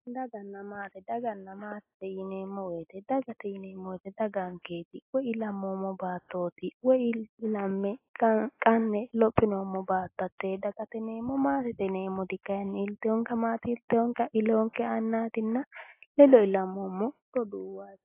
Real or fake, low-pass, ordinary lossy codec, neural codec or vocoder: real; 3.6 kHz; AAC, 24 kbps; none